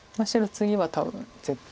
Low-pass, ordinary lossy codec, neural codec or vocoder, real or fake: none; none; none; real